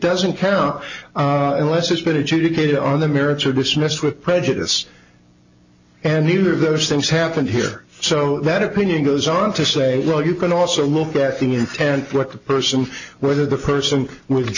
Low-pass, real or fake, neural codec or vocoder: 7.2 kHz; real; none